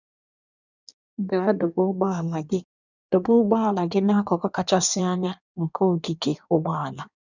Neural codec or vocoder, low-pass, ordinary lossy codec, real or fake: codec, 16 kHz in and 24 kHz out, 1.1 kbps, FireRedTTS-2 codec; 7.2 kHz; none; fake